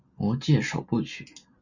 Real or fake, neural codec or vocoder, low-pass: real; none; 7.2 kHz